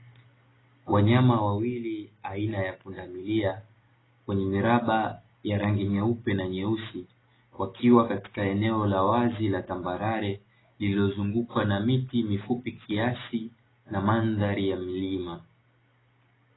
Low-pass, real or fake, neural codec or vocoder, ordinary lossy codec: 7.2 kHz; real; none; AAC, 16 kbps